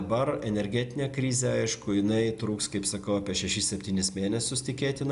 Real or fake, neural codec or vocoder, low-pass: real; none; 10.8 kHz